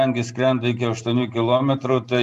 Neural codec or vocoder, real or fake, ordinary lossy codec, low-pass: none; real; AAC, 96 kbps; 14.4 kHz